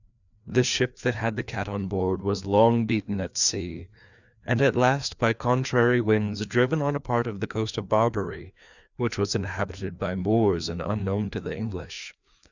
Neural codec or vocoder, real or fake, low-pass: codec, 16 kHz, 2 kbps, FreqCodec, larger model; fake; 7.2 kHz